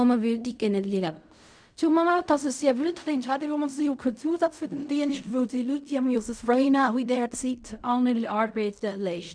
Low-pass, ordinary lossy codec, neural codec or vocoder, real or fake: 9.9 kHz; none; codec, 16 kHz in and 24 kHz out, 0.4 kbps, LongCat-Audio-Codec, fine tuned four codebook decoder; fake